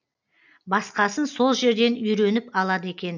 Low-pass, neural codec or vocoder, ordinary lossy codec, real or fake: 7.2 kHz; none; none; real